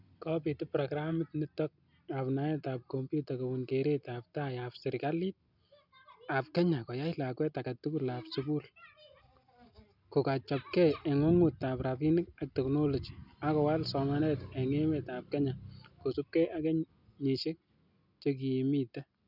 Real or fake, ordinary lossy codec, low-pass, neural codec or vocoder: real; none; 5.4 kHz; none